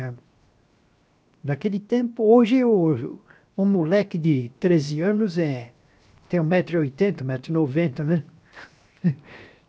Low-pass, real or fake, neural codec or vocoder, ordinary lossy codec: none; fake; codec, 16 kHz, 0.7 kbps, FocalCodec; none